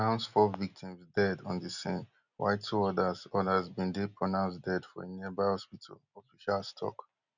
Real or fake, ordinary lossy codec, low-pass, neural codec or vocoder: real; none; 7.2 kHz; none